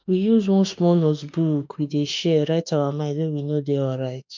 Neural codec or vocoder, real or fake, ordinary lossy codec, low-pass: autoencoder, 48 kHz, 32 numbers a frame, DAC-VAE, trained on Japanese speech; fake; MP3, 64 kbps; 7.2 kHz